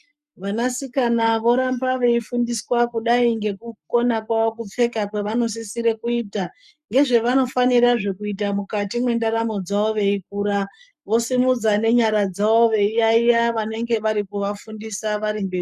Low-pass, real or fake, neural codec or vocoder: 14.4 kHz; fake; codec, 44.1 kHz, 7.8 kbps, Pupu-Codec